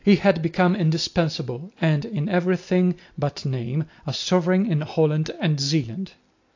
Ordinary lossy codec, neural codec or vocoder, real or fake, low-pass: AAC, 48 kbps; none; real; 7.2 kHz